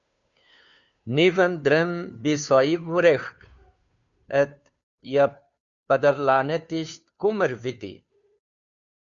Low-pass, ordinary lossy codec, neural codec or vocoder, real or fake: 7.2 kHz; MP3, 96 kbps; codec, 16 kHz, 2 kbps, FunCodec, trained on Chinese and English, 25 frames a second; fake